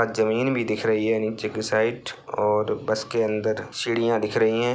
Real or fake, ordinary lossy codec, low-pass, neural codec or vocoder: real; none; none; none